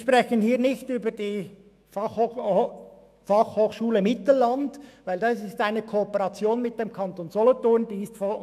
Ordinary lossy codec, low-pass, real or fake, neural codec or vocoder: AAC, 96 kbps; 14.4 kHz; fake; autoencoder, 48 kHz, 128 numbers a frame, DAC-VAE, trained on Japanese speech